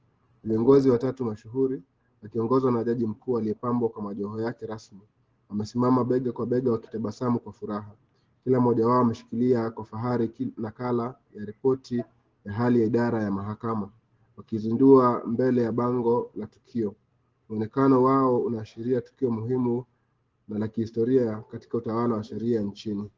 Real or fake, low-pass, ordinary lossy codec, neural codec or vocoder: real; 7.2 kHz; Opus, 16 kbps; none